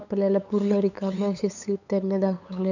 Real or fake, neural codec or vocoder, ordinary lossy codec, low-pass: fake; codec, 16 kHz, 8 kbps, FunCodec, trained on LibriTTS, 25 frames a second; none; 7.2 kHz